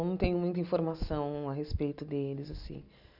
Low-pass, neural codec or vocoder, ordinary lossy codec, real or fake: 5.4 kHz; none; none; real